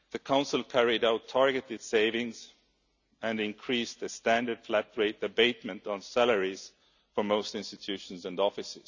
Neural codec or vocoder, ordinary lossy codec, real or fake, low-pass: none; none; real; 7.2 kHz